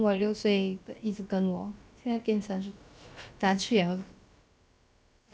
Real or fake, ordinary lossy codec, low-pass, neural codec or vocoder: fake; none; none; codec, 16 kHz, about 1 kbps, DyCAST, with the encoder's durations